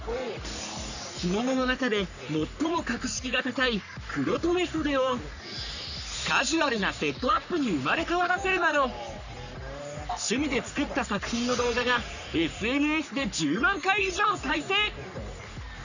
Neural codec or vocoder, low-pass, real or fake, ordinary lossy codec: codec, 44.1 kHz, 3.4 kbps, Pupu-Codec; 7.2 kHz; fake; none